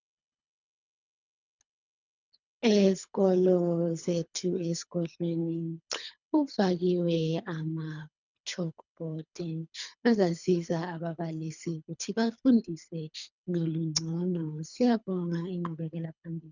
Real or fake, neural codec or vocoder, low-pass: fake; codec, 24 kHz, 3 kbps, HILCodec; 7.2 kHz